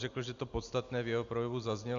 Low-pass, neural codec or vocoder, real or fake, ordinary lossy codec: 7.2 kHz; none; real; Opus, 64 kbps